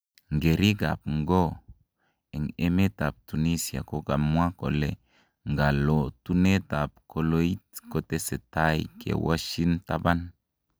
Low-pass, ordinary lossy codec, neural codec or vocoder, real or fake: none; none; none; real